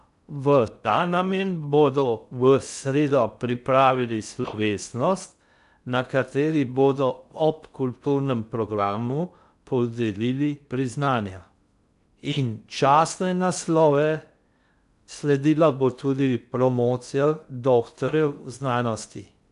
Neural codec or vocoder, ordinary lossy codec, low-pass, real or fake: codec, 16 kHz in and 24 kHz out, 0.6 kbps, FocalCodec, streaming, 2048 codes; none; 10.8 kHz; fake